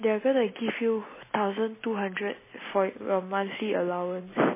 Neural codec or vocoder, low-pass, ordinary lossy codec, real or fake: none; 3.6 kHz; MP3, 16 kbps; real